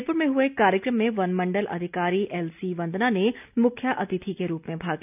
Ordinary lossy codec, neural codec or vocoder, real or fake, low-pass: none; none; real; 3.6 kHz